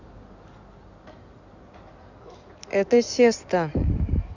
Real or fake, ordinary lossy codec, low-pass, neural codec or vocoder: fake; none; 7.2 kHz; codec, 16 kHz in and 24 kHz out, 2.2 kbps, FireRedTTS-2 codec